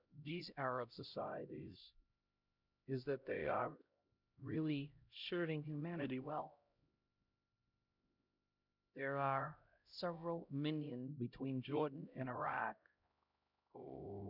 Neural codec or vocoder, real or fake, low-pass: codec, 16 kHz, 0.5 kbps, X-Codec, HuBERT features, trained on LibriSpeech; fake; 5.4 kHz